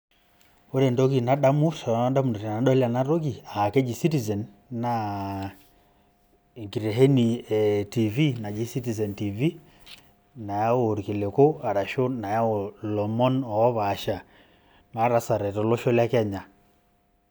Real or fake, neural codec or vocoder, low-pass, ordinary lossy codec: real; none; none; none